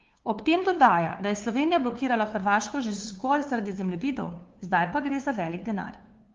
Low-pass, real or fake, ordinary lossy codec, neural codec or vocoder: 7.2 kHz; fake; Opus, 32 kbps; codec, 16 kHz, 2 kbps, FunCodec, trained on LibriTTS, 25 frames a second